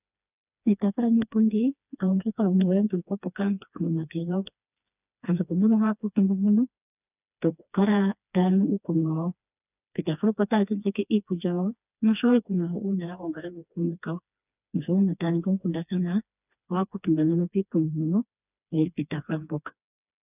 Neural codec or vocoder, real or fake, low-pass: codec, 16 kHz, 2 kbps, FreqCodec, smaller model; fake; 3.6 kHz